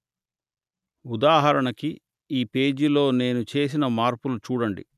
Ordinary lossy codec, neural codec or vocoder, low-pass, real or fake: none; none; 14.4 kHz; real